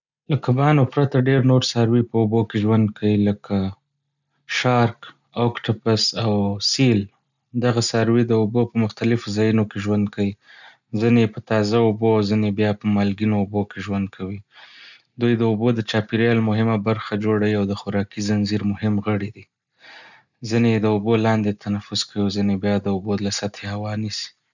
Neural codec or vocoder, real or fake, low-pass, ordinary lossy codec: none; real; 7.2 kHz; none